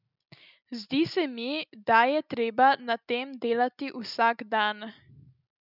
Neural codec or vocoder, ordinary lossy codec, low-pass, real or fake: none; none; 5.4 kHz; real